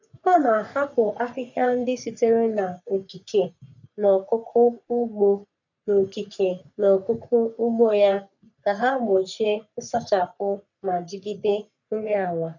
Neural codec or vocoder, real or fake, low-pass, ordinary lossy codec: codec, 44.1 kHz, 3.4 kbps, Pupu-Codec; fake; 7.2 kHz; none